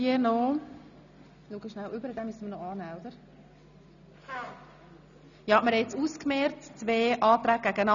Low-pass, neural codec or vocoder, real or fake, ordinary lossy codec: 7.2 kHz; none; real; none